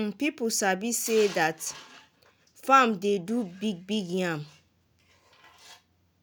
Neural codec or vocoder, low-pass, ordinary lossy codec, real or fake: none; none; none; real